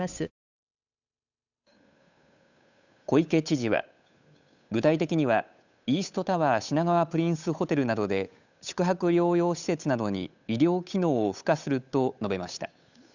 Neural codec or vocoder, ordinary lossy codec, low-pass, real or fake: codec, 16 kHz, 8 kbps, FunCodec, trained on Chinese and English, 25 frames a second; none; 7.2 kHz; fake